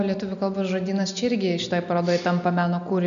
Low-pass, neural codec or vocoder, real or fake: 7.2 kHz; none; real